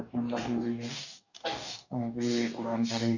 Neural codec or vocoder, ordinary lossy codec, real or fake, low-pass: codec, 44.1 kHz, 2.6 kbps, DAC; none; fake; 7.2 kHz